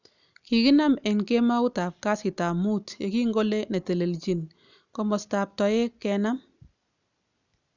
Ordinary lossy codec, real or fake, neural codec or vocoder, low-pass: none; real; none; 7.2 kHz